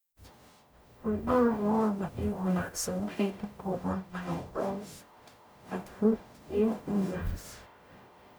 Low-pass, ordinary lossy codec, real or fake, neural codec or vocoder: none; none; fake; codec, 44.1 kHz, 0.9 kbps, DAC